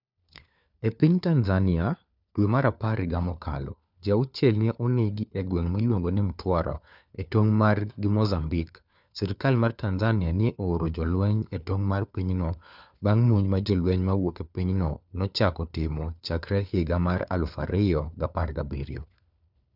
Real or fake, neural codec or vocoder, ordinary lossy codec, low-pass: fake; codec, 16 kHz, 4 kbps, FunCodec, trained on LibriTTS, 50 frames a second; none; 5.4 kHz